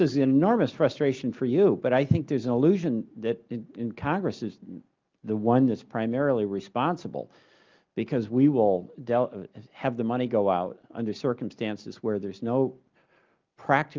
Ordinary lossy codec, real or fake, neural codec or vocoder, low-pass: Opus, 24 kbps; real; none; 7.2 kHz